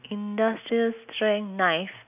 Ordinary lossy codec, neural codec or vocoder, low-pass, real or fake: none; none; 3.6 kHz; real